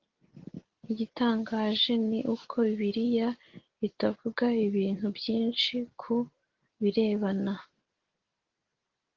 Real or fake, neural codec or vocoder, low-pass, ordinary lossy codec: real; none; 7.2 kHz; Opus, 16 kbps